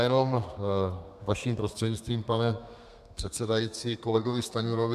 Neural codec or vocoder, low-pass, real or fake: codec, 32 kHz, 1.9 kbps, SNAC; 14.4 kHz; fake